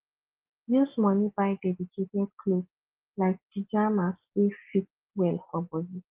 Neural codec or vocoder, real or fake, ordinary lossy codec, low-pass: none; real; Opus, 16 kbps; 3.6 kHz